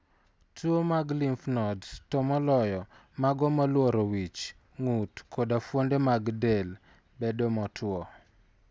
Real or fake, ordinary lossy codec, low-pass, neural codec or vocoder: real; none; none; none